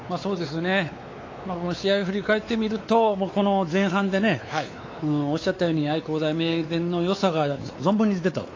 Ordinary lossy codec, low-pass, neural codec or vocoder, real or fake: AAC, 32 kbps; 7.2 kHz; codec, 16 kHz, 4 kbps, X-Codec, WavLM features, trained on Multilingual LibriSpeech; fake